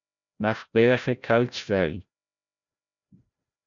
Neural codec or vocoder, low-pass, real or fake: codec, 16 kHz, 0.5 kbps, FreqCodec, larger model; 7.2 kHz; fake